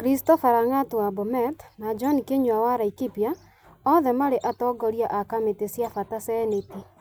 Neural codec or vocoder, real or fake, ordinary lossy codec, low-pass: none; real; none; none